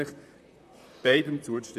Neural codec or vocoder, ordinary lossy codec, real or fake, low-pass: vocoder, 44.1 kHz, 128 mel bands, Pupu-Vocoder; none; fake; 14.4 kHz